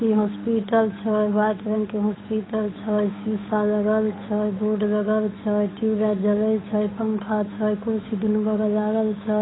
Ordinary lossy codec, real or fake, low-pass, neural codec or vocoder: AAC, 16 kbps; fake; 7.2 kHz; codec, 16 kHz, 8 kbps, FunCodec, trained on Chinese and English, 25 frames a second